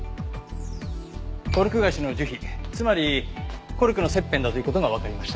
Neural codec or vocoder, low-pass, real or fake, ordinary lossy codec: none; none; real; none